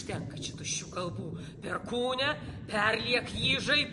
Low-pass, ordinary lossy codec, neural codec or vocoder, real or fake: 14.4 kHz; MP3, 48 kbps; none; real